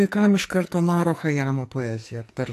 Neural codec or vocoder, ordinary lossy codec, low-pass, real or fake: codec, 44.1 kHz, 2.6 kbps, SNAC; AAC, 64 kbps; 14.4 kHz; fake